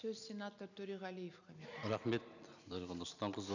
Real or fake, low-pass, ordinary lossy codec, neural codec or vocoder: real; 7.2 kHz; none; none